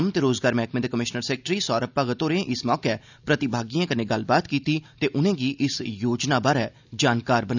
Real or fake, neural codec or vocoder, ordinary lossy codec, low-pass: real; none; none; 7.2 kHz